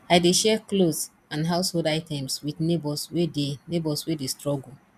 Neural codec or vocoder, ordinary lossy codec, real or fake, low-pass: none; none; real; 14.4 kHz